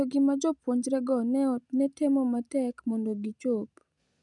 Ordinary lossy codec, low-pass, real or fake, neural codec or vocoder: none; 10.8 kHz; real; none